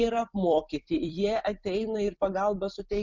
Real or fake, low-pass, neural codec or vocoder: fake; 7.2 kHz; vocoder, 44.1 kHz, 128 mel bands every 256 samples, BigVGAN v2